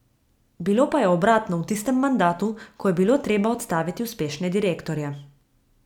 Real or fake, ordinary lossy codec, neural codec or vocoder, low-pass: real; none; none; 19.8 kHz